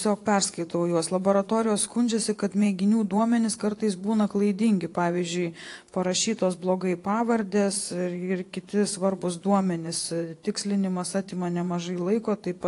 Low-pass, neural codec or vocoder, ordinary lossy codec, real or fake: 10.8 kHz; vocoder, 24 kHz, 100 mel bands, Vocos; AAC, 48 kbps; fake